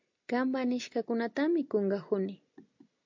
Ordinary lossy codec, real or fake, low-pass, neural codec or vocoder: MP3, 48 kbps; real; 7.2 kHz; none